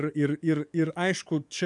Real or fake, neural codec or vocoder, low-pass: fake; codec, 44.1 kHz, 7.8 kbps, DAC; 10.8 kHz